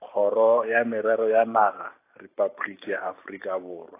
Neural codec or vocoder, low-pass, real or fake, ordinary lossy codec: vocoder, 44.1 kHz, 128 mel bands every 512 samples, BigVGAN v2; 3.6 kHz; fake; AAC, 24 kbps